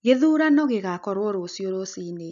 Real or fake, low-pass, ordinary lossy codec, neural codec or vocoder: real; 7.2 kHz; none; none